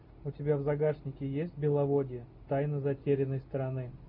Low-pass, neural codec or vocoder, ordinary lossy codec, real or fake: 5.4 kHz; none; MP3, 48 kbps; real